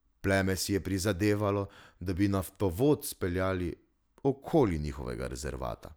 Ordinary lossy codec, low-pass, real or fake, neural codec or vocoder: none; none; real; none